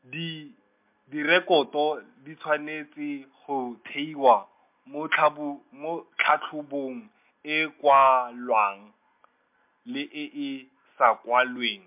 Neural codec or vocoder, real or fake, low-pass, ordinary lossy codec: none; real; 3.6 kHz; MP3, 32 kbps